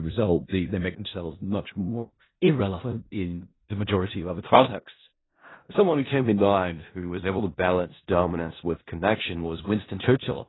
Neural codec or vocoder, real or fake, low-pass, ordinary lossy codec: codec, 16 kHz in and 24 kHz out, 0.4 kbps, LongCat-Audio-Codec, four codebook decoder; fake; 7.2 kHz; AAC, 16 kbps